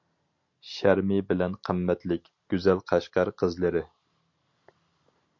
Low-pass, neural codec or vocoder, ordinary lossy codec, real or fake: 7.2 kHz; none; MP3, 48 kbps; real